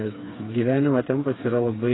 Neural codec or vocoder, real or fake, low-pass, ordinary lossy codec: codec, 16 kHz, 4 kbps, FreqCodec, smaller model; fake; 7.2 kHz; AAC, 16 kbps